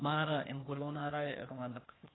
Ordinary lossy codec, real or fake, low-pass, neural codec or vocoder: AAC, 16 kbps; fake; 7.2 kHz; codec, 16 kHz, 0.8 kbps, ZipCodec